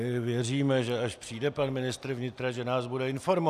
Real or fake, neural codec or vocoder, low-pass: real; none; 14.4 kHz